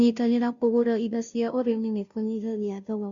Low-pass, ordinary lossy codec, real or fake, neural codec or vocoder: 7.2 kHz; none; fake; codec, 16 kHz, 0.5 kbps, FunCodec, trained on Chinese and English, 25 frames a second